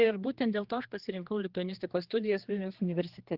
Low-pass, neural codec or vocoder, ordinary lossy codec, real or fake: 5.4 kHz; codec, 16 kHz, 2 kbps, X-Codec, HuBERT features, trained on general audio; Opus, 16 kbps; fake